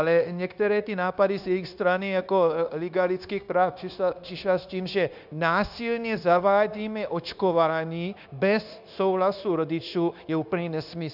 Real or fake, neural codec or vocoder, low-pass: fake; codec, 16 kHz, 0.9 kbps, LongCat-Audio-Codec; 5.4 kHz